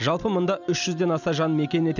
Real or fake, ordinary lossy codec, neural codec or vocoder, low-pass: real; none; none; 7.2 kHz